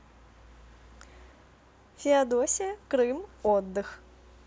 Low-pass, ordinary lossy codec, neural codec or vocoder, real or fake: none; none; none; real